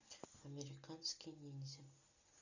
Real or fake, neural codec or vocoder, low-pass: real; none; 7.2 kHz